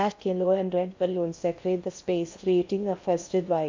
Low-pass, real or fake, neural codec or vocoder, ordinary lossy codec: 7.2 kHz; fake; codec, 16 kHz in and 24 kHz out, 0.6 kbps, FocalCodec, streaming, 2048 codes; MP3, 64 kbps